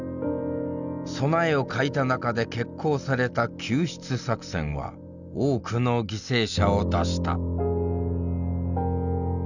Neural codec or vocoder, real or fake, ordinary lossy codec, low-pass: none; real; none; 7.2 kHz